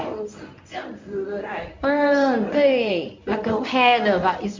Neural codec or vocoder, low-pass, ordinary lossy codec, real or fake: codec, 24 kHz, 0.9 kbps, WavTokenizer, medium speech release version 1; 7.2 kHz; MP3, 64 kbps; fake